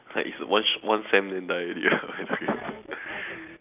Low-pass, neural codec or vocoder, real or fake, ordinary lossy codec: 3.6 kHz; none; real; none